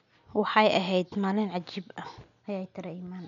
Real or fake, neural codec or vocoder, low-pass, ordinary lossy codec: real; none; 7.2 kHz; none